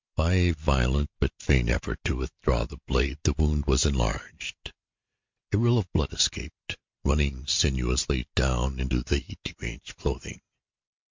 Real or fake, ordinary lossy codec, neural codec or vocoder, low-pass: real; MP3, 64 kbps; none; 7.2 kHz